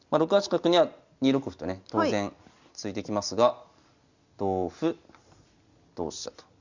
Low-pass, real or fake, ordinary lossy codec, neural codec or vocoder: 7.2 kHz; real; Opus, 64 kbps; none